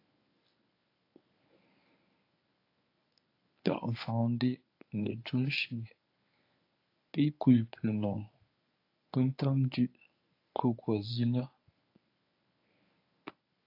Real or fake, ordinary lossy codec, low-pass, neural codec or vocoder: fake; AAC, 32 kbps; 5.4 kHz; codec, 24 kHz, 0.9 kbps, WavTokenizer, medium speech release version 1